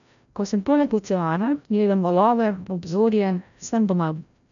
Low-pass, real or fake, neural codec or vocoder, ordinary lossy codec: 7.2 kHz; fake; codec, 16 kHz, 0.5 kbps, FreqCodec, larger model; none